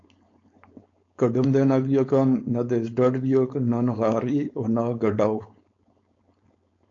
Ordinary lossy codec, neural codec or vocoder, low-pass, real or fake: AAC, 48 kbps; codec, 16 kHz, 4.8 kbps, FACodec; 7.2 kHz; fake